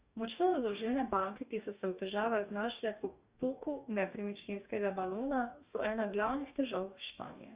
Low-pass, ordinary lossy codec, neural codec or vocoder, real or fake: 3.6 kHz; Opus, 64 kbps; codec, 44.1 kHz, 2.6 kbps, DAC; fake